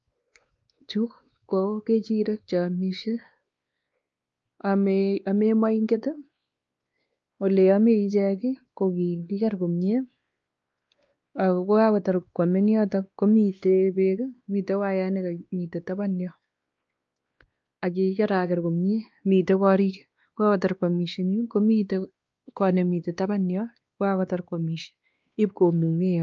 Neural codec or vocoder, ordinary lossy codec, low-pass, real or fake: codec, 16 kHz, 2 kbps, X-Codec, WavLM features, trained on Multilingual LibriSpeech; Opus, 24 kbps; 7.2 kHz; fake